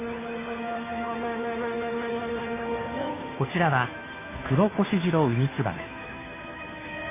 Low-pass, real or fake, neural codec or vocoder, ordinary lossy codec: 3.6 kHz; fake; codec, 16 kHz, 2 kbps, FunCodec, trained on Chinese and English, 25 frames a second; MP3, 16 kbps